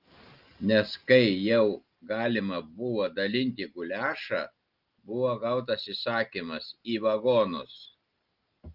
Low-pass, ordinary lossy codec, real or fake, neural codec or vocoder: 5.4 kHz; Opus, 32 kbps; real; none